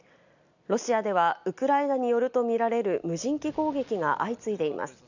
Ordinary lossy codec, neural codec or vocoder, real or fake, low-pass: none; none; real; 7.2 kHz